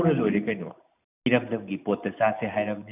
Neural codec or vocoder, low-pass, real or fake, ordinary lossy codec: none; 3.6 kHz; real; none